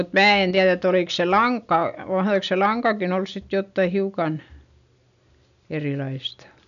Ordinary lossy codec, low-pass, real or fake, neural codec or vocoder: none; 7.2 kHz; real; none